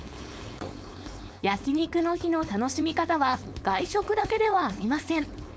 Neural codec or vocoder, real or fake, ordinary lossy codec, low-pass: codec, 16 kHz, 4.8 kbps, FACodec; fake; none; none